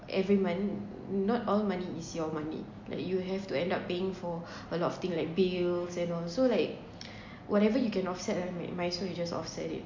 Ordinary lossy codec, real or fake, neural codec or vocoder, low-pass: MP3, 48 kbps; real; none; 7.2 kHz